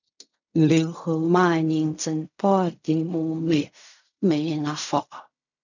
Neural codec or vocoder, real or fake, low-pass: codec, 16 kHz in and 24 kHz out, 0.4 kbps, LongCat-Audio-Codec, fine tuned four codebook decoder; fake; 7.2 kHz